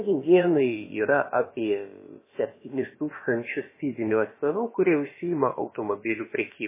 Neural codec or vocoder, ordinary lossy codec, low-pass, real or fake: codec, 16 kHz, about 1 kbps, DyCAST, with the encoder's durations; MP3, 16 kbps; 3.6 kHz; fake